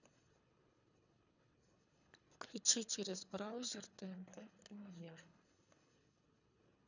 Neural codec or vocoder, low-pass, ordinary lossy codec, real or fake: codec, 24 kHz, 3 kbps, HILCodec; 7.2 kHz; none; fake